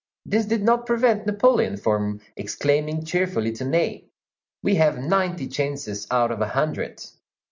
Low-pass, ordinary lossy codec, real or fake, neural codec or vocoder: 7.2 kHz; MP3, 64 kbps; real; none